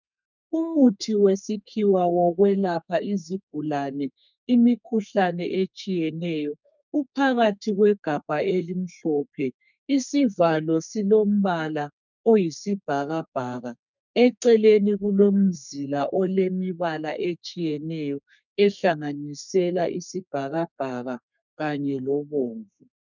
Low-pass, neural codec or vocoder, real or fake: 7.2 kHz; codec, 32 kHz, 1.9 kbps, SNAC; fake